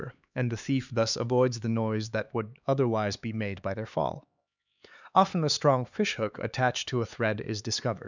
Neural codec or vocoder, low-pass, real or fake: codec, 16 kHz, 4 kbps, X-Codec, HuBERT features, trained on LibriSpeech; 7.2 kHz; fake